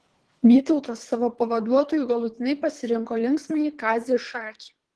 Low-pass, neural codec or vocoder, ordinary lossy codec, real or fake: 10.8 kHz; codec, 24 kHz, 3 kbps, HILCodec; Opus, 16 kbps; fake